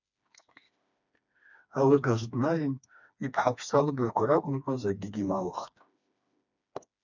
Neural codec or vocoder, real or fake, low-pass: codec, 16 kHz, 2 kbps, FreqCodec, smaller model; fake; 7.2 kHz